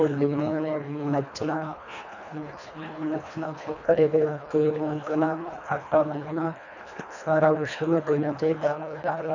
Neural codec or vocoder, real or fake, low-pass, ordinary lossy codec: codec, 24 kHz, 1.5 kbps, HILCodec; fake; 7.2 kHz; none